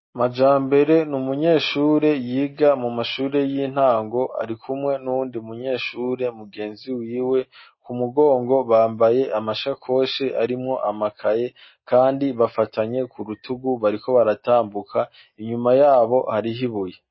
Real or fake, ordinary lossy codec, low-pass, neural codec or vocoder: real; MP3, 24 kbps; 7.2 kHz; none